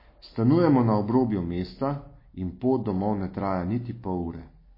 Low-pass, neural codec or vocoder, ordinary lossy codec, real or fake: 5.4 kHz; none; MP3, 24 kbps; real